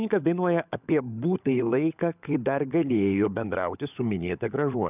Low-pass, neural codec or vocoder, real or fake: 3.6 kHz; codec, 16 kHz, 16 kbps, FunCodec, trained on LibriTTS, 50 frames a second; fake